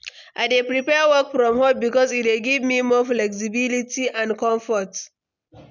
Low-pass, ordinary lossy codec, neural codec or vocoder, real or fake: 7.2 kHz; none; none; real